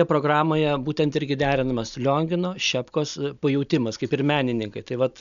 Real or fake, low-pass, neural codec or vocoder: real; 7.2 kHz; none